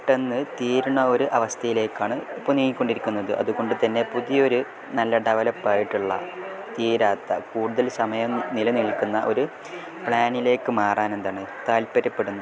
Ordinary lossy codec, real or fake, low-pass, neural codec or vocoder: none; real; none; none